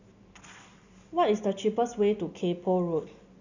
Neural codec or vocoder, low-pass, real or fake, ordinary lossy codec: none; 7.2 kHz; real; none